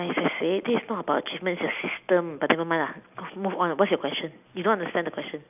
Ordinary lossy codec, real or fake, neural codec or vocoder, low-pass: none; real; none; 3.6 kHz